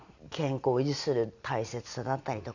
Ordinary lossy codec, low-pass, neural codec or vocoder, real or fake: none; 7.2 kHz; none; real